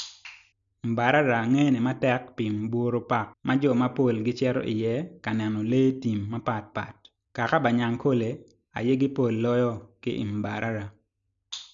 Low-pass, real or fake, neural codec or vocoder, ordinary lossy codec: 7.2 kHz; real; none; none